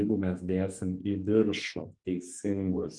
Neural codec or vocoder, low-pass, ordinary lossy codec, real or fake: codec, 32 kHz, 1.9 kbps, SNAC; 10.8 kHz; Opus, 24 kbps; fake